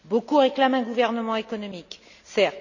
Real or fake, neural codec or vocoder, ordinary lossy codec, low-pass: real; none; none; 7.2 kHz